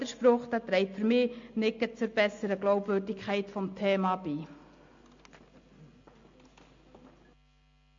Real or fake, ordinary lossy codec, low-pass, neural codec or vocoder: real; MP3, 48 kbps; 7.2 kHz; none